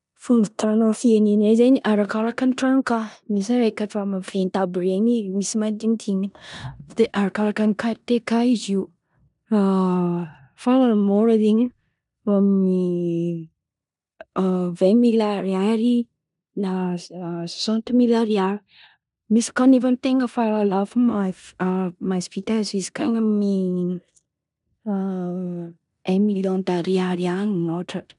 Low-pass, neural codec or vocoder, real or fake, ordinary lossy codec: 10.8 kHz; codec, 16 kHz in and 24 kHz out, 0.9 kbps, LongCat-Audio-Codec, four codebook decoder; fake; none